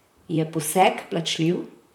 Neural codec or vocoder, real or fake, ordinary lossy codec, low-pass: vocoder, 44.1 kHz, 128 mel bands, Pupu-Vocoder; fake; none; 19.8 kHz